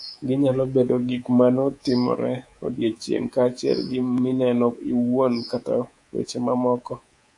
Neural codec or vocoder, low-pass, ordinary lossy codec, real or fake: codec, 24 kHz, 3.1 kbps, DualCodec; 10.8 kHz; AAC, 64 kbps; fake